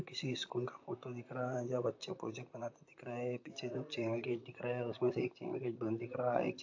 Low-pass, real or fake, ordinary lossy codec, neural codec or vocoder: 7.2 kHz; fake; AAC, 48 kbps; vocoder, 44.1 kHz, 128 mel bands, Pupu-Vocoder